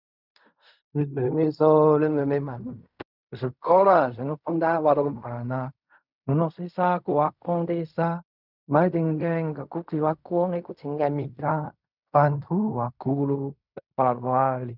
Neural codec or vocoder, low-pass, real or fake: codec, 16 kHz in and 24 kHz out, 0.4 kbps, LongCat-Audio-Codec, fine tuned four codebook decoder; 5.4 kHz; fake